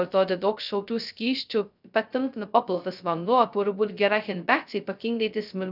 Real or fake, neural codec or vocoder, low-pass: fake; codec, 16 kHz, 0.2 kbps, FocalCodec; 5.4 kHz